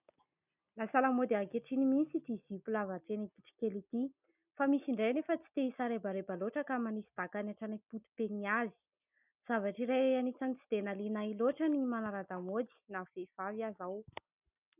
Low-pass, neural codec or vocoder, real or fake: 3.6 kHz; none; real